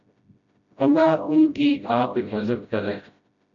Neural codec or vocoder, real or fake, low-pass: codec, 16 kHz, 0.5 kbps, FreqCodec, smaller model; fake; 7.2 kHz